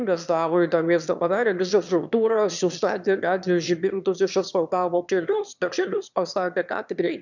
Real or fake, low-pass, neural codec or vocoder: fake; 7.2 kHz; autoencoder, 22.05 kHz, a latent of 192 numbers a frame, VITS, trained on one speaker